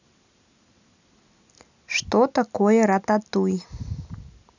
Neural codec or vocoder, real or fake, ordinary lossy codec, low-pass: none; real; none; 7.2 kHz